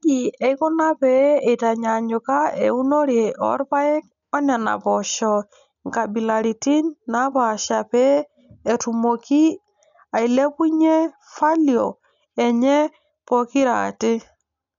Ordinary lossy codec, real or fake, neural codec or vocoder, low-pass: none; real; none; 7.2 kHz